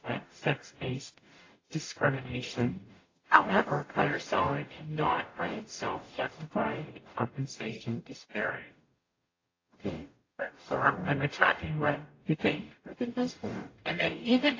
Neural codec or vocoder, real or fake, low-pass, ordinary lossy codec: codec, 44.1 kHz, 0.9 kbps, DAC; fake; 7.2 kHz; AAC, 32 kbps